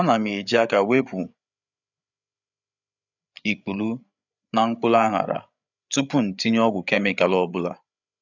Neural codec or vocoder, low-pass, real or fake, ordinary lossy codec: codec, 16 kHz, 8 kbps, FreqCodec, larger model; 7.2 kHz; fake; none